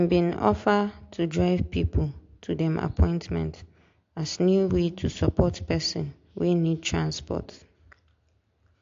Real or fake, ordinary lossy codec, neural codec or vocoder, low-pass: real; AAC, 48 kbps; none; 7.2 kHz